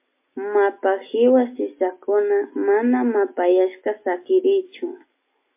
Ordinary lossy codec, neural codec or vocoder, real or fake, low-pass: MP3, 24 kbps; none; real; 3.6 kHz